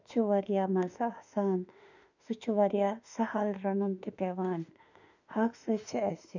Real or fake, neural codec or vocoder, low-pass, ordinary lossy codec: fake; autoencoder, 48 kHz, 32 numbers a frame, DAC-VAE, trained on Japanese speech; 7.2 kHz; none